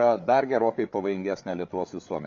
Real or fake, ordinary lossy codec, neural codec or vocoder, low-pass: fake; MP3, 48 kbps; codec, 16 kHz, 8 kbps, FreqCodec, larger model; 7.2 kHz